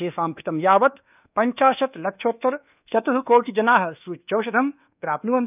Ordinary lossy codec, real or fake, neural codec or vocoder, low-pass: none; fake; codec, 24 kHz, 1.2 kbps, DualCodec; 3.6 kHz